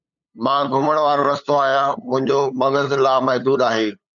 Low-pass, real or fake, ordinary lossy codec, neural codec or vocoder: 7.2 kHz; fake; MP3, 96 kbps; codec, 16 kHz, 8 kbps, FunCodec, trained on LibriTTS, 25 frames a second